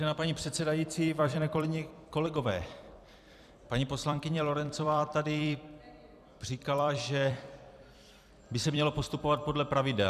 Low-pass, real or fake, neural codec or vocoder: 14.4 kHz; fake; vocoder, 44.1 kHz, 128 mel bands every 256 samples, BigVGAN v2